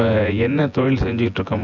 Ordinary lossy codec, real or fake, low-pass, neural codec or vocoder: none; fake; 7.2 kHz; vocoder, 24 kHz, 100 mel bands, Vocos